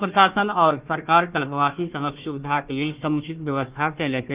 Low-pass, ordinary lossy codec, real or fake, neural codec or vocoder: 3.6 kHz; Opus, 32 kbps; fake; codec, 16 kHz, 1 kbps, FunCodec, trained on Chinese and English, 50 frames a second